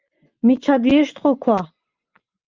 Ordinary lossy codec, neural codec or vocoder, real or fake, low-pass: Opus, 32 kbps; vocoder, 24 kHz, 100 mel bands, Vocos; fake; 7.2 kHz